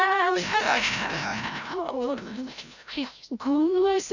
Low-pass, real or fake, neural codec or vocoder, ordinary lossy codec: 7.2 kHz; fake; codec, 16 kHz, 0.5 kbps, FreqCodec, larger model; none